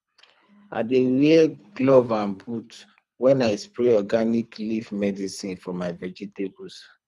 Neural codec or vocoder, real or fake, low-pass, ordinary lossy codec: codec, 24 kHz, 3 kbps, HILCodec; fake; none; none